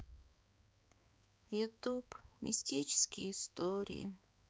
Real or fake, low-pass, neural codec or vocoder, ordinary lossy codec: fake; none; codec, 16 kHz, 4 kbps, X-Codec, HuBERT features, trained on balanced general audio; none